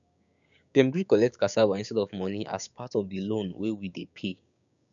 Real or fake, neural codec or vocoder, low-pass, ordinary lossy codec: fake; codec, 16 kHz, 6 kbps, DAC; 7.2 kHz; none